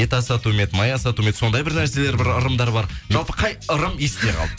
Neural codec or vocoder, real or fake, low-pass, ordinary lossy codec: none; real; none; none